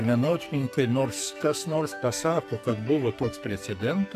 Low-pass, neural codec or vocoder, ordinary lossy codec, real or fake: 14.4 kHz; codec, 44.1 kHz, 2.6 kbps, SNAC; AAC, 96 kbps; fake